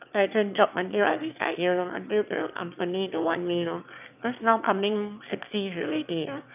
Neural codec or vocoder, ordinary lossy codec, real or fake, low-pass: autoencoder, 22.05 kHz, a latent of 192 numbers a frame, VITS, trained on one speaker; none; fake; 3.6 kHz